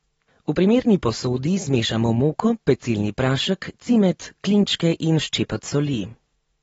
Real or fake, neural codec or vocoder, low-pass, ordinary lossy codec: real; none; 19.8 kHz; AAC, 24 kbps